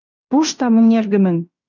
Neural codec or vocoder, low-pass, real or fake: codec, 16 kHz in and 24 kHz out, 0.9 kbps, LongCat-Audio-Codec, fine tuned four codebook decoder; 7.2 kHz; fake